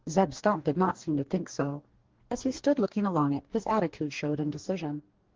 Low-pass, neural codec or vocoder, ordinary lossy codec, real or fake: 7.2 kHz; codec, 44.1 kHz, 2.6 kbps, DAC; Opus, 16 kbps; fake